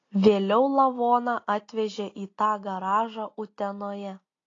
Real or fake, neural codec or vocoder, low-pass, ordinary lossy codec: real; none; 7.2 kHz; AAC, 32 kbps